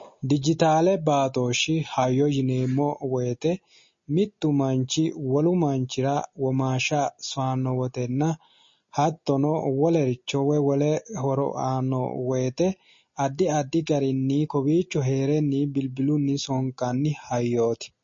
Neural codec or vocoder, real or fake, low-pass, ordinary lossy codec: none; real; 7.2 kHz; MP3, 32 kbps